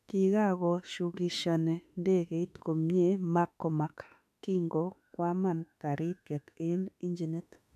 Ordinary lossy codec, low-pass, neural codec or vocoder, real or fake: none; 14.4 kHz; autoencoder, 48 kHz, 32 numbers a frame, DAC-VAE, trained on Japanese speech; fake